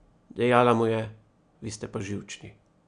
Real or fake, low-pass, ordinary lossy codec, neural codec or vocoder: real; 9.9 kHz; none; none